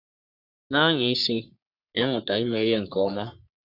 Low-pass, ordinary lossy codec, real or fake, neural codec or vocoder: 5.4 kHz; none; fake; codec, 44.1 kHz, 3.4 kbps, Pupu-Codec